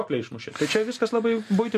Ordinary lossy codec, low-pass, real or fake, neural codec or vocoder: MP3, 64 kbps; 14.4 kHz; real; none